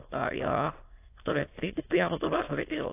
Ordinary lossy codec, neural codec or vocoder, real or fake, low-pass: AAC, 24 kbps; autoencoder, 22.05 kHz, a latent of 192 numbers a frame, VITS, trained on many speakers; fake; 3.6 kHz